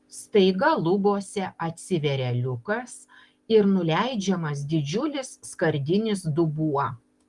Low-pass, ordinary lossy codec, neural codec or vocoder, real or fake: 10.8 kHz; Opus, 24 kbps; none; real